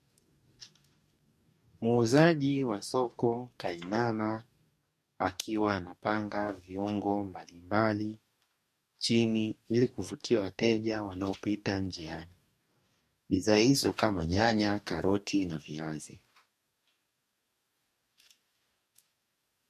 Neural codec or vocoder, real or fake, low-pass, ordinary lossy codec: codec, 44.1 kHz, 2.6 kbps, DAC; fake; 14.4 kHz; MP3, 64 kbps